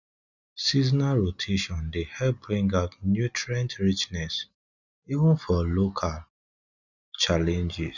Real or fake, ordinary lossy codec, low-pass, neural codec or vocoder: real; none; 7.2 kHz; none